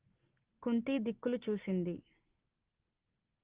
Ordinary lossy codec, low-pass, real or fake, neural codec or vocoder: Opus, 16 kbps; 3.6 kHz; real; none